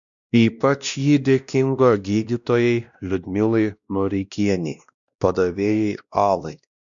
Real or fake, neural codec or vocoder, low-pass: fake; codec, 16 kHz, 1 kbps, X-Codec, WavLM features, trained on Multilingual LibriSpeech; 7.2 kHz